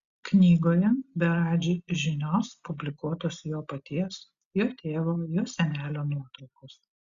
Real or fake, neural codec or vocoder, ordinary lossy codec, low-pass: real; none; Opus, 64 kbps; 7.2 kHz